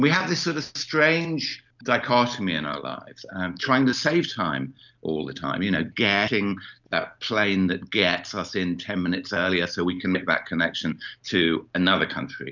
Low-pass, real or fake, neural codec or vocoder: 7.2 kHz; real; none